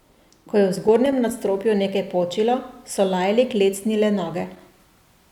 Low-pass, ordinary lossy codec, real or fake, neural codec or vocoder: 19.8 kHz; none; real; none